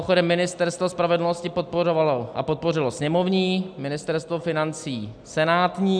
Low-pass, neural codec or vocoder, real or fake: 9.9 kHz; none; real